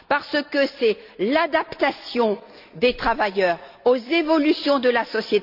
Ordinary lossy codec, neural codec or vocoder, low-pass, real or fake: none; none; 5.4 kHz; real